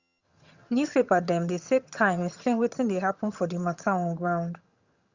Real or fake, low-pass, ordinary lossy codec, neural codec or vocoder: fake; 7.2 kHz; Opus, 64 kbps; vocoder, 22.05 kHz, 80 mel bands, HiFi-GAN